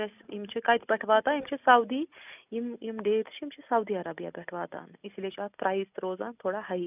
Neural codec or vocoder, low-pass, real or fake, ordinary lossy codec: none; 3.6 kHz; real; none